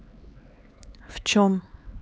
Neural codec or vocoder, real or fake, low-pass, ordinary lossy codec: codec, 16 kHz, 4 kbps, X-Codec, HuBERT features, trained on LibriSpeech; fake; none; none